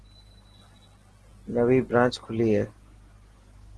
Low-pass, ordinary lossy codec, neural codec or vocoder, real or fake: 10.8 kHz; Opus, 16 kbps; none; real